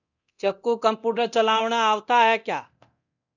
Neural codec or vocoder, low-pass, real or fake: codec, 24 kHz, 0.9 kbps, DualCodec; 7.2 kHz; fake